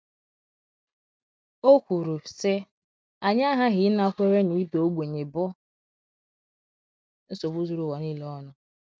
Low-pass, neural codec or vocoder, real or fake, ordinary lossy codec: none; none; real; none